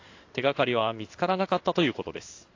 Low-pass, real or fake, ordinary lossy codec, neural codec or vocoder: 7.2 kHz; fake; AAC, 48 kbps; codec, 16 kHz in and 24 kHz out, 1 kbps, XY-Tokenizer